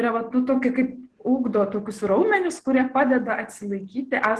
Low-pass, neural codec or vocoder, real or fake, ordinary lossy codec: 10.8 kHz; none; real; Opus, 16 kbps